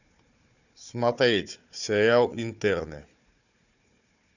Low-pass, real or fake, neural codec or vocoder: 7.2 kHz; fake; codec, 16 kHz, 4 kbps, FunCodec, trained on Chinese and English, 50 frames a second